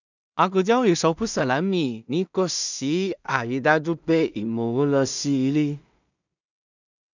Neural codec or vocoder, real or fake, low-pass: codec, 16 kHz in and 24 kHz out, 0.4 kbps, LongCat-Audio-Codec, two codebook decoder; fake; 7.2 kHz